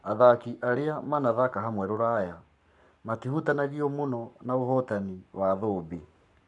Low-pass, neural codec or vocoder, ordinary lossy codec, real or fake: 10.8 kHz; codec, 44.1 kHz, 7.8 kbps, Pupu-Codec; none; fake